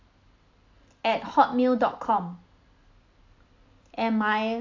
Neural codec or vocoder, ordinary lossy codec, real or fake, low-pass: none; AAC, 48 kbps; real; 7.2 kHz